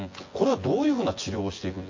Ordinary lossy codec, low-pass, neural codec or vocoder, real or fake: MP3, 32 kbps; 7.2 kHz; vocoder, 24 kHz, 100 mel bands, Vocos; fake